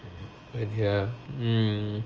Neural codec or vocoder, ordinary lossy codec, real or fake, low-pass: none; Opus, 24 kbps; real; 7.2 kHz